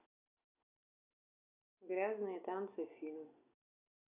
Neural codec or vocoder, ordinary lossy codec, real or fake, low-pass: none; none; real; 3.6 kHz